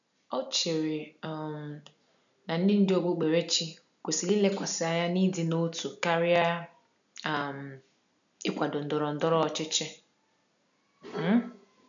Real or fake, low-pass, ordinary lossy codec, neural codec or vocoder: real; 7.2 kHz; none; none